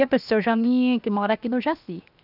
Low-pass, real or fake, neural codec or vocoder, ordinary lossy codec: 5.4 kHz; fake; codec, 16 kHz, 0.7 kbps, FocalCodec; none